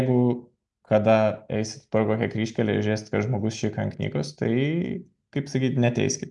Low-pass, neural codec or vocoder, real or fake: 10.8 kHz; none; real